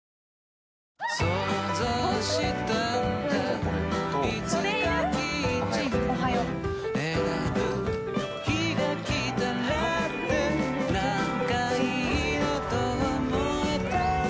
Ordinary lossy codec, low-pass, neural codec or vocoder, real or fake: none; none; none; real